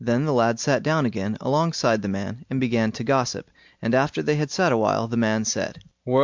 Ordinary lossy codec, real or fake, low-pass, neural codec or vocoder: MP3, 64 kbps; real; 7.2 kHz; none